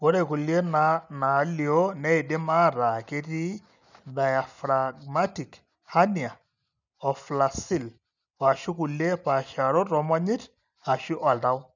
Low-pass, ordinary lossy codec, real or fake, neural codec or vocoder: 7.2 kHz; none; real; none